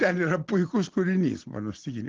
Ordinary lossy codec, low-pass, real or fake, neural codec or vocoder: Opus, 16 kbps; 7.2 kHz; real; none